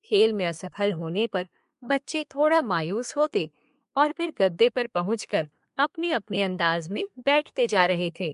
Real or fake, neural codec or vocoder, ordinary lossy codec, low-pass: fake; codec, 24 kHz, 1 kbps, SNAC; MP3, 64 kbps; 10.8 kHz